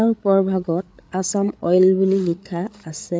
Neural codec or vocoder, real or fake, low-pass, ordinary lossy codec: codec, 16 kHz, 8 kbps, FreqCodec, larger model; fake; none; none